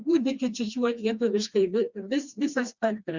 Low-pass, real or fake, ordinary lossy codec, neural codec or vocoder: 7.2 kHz; fake; Opus, 64 kbps; codec, 16 kHz, 2 kbps, FreqCodec, smaller model